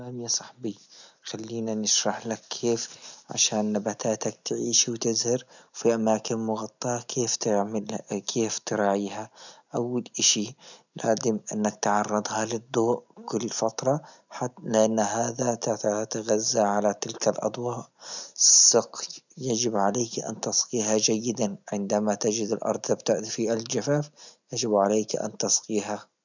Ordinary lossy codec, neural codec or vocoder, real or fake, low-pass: none; none; real; 7.2 kHz